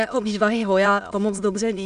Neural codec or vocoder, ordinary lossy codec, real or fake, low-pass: autoencoder, 22.05 kHz, a latent of 192 numbers a frame, VITS, trained on many speakers; AAC, 96 kbps; fake; 9.9 kHz